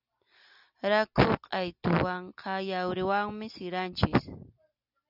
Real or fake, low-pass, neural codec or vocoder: real; 5.4 kHz; none